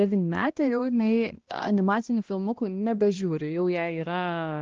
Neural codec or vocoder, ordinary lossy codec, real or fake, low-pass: codec, 16 kHz, 1 kbps, X-Codec, HuBERT features, trained on balanced general audio; Opus, 16 kbps; fake; 7.2 kHz